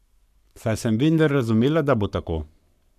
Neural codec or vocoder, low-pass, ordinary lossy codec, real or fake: codec, 44.1 kHz, 7.8 kbps, Pupu-Codec; 14.4 kHz; none; fake